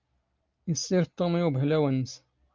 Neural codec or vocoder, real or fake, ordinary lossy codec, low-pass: none; real; Opus, 24 kbps; 7.2 kHz